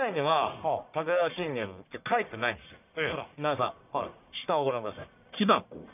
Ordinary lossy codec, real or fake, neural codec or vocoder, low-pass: none; fake; codec, 44.1 kHz, 1.7 kbps, Pupu-Codec; 3.6 kHz